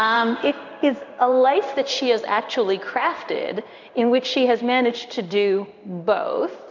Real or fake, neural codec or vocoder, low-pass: fake; codec, 16 kHz in and 24 kHz out, 1 kbps, XY-Tokenizer; 7.2 kHz